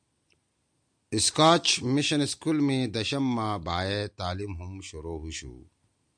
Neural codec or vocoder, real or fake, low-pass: none; real; 9.9 kHz